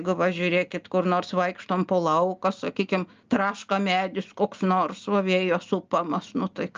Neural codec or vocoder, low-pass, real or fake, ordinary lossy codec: none; 7.2 kHz; real; Opus, 24 kbps